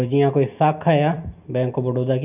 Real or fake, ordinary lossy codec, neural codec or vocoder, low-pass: real; none; none; 3.6 kHz